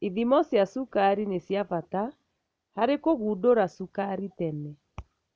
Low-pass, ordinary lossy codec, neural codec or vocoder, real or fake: none; none; none; real